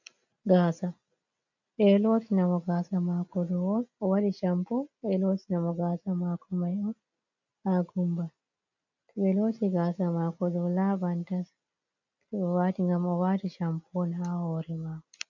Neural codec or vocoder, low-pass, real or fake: none; 7.2 kHz; real